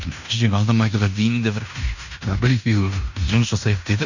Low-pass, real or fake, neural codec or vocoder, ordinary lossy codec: 7.2 kHz; fake; codec, 16 kHz in and 24 kHz out, 0.9 kbps, LongCat-Audio-Codec, fine tuned four codebook decoder; none